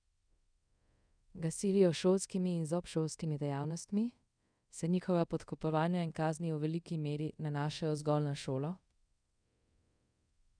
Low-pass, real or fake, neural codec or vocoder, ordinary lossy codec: 9.9 kHz; fake; codec, 24 kHz, 0.5 kbps, DualCodec; none